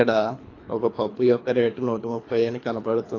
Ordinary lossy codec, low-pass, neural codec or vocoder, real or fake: AAC, 32 kbps; 7.2 kHz; codec, 24 kHz, 3 kbps, HILCodec; fake